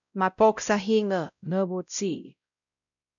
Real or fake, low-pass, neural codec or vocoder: fake; 7.2 kHz; codec, 16 kHz, 0.5 kbps, X-Codec, WavLM features, trained on Multilingual LibriSpeech